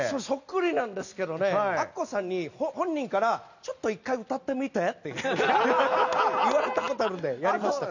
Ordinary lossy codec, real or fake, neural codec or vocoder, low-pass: none; real; none; 7.2 kHz